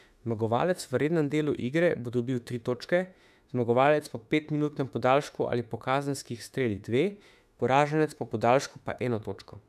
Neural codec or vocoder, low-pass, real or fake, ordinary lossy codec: autoencoder, 48 kHz, 32 numbers a frame, DAC-VAE, trained on Japanese speech; 14.4 kHz; fake; none